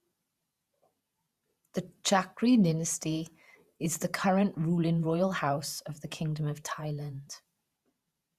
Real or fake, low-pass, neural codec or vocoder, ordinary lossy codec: fake; 14.4 kHz; vocoder, 48 kHz, 128 mel bands, Vocos; Opus, 64 kbps